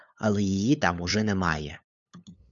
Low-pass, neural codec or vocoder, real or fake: 7.2 kHz; codec, 16 kHz, 8 kbps, FunCodec, trained on LibriTTS, 25 frames a second; fake